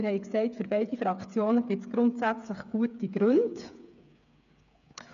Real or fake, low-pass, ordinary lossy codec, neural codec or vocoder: fake; 7.2 kHz; none; codec, 16 kHz, 8 kbps, FreqCodec, smaller model